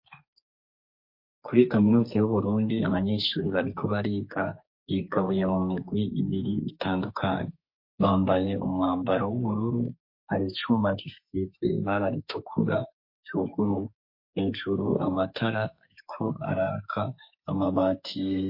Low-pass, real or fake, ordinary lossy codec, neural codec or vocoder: 5.4 kHz; fake; MP3, 32 kbps; codec, 32 kHz, 1.9 kbps, SNAC